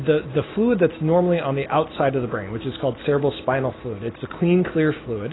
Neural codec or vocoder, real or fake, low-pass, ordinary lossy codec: none; real; 7.2 kHz; AAC, 16 kbps